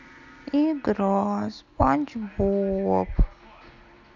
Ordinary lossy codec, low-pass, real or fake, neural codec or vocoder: none; 7.2 kHz; real; none